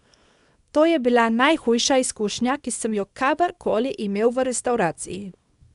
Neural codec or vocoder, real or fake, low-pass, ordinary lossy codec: codec, 24 kHz, 0.9 kbps, WavTokenizer, small release; fake; 10.8 kHz; none